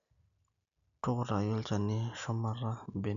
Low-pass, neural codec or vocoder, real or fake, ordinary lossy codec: 7.2 kHz; none; real; none